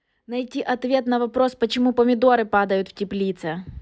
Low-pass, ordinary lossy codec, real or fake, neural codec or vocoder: none; none; real; none